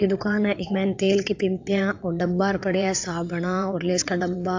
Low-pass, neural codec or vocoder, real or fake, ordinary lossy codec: 7.2 kHz; vocoder, 22.05 kHz, 80 mel bands, WaveNeXt; fake; AAC, 48 kbps